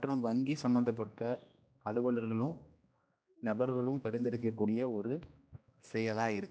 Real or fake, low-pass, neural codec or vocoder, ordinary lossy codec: fake; none; codec, 16 kHz, 1 kbps, X-Codec, HuBERT features, trained on general audio; none